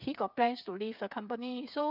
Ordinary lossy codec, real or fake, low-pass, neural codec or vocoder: none; fake; 5.4 kHz; codec, 16 kHz, 8 kbps, FreqCodec, smaller model